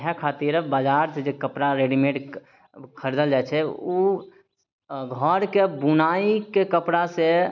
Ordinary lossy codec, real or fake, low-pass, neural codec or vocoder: none; real; 7.2 kHz; none